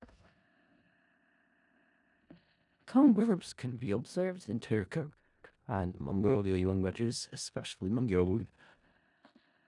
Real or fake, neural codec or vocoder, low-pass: fake; codec, 16 kHz in and 24 kHz out, 0.4 kbps, LongCat-Audio-Codec, four codebook decoder; 10.8 kHz